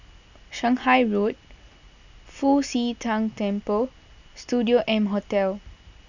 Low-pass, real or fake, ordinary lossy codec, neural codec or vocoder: 7.2 kHz; real; none; none